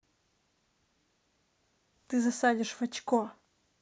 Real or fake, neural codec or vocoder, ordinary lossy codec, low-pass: real; none; none; none